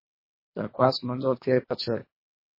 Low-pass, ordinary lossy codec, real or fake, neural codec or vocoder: 5.4 kHz; MP3, 24 kbps; fake; codec, 24 kHz, 1.5 kbps, HILCodec